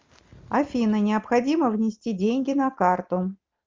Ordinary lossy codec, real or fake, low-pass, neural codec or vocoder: Opus, 32 kbps; real; 7.2 kHz; none